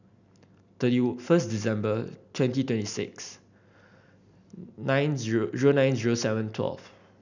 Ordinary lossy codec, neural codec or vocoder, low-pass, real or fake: none; none; 7.2 kHz; real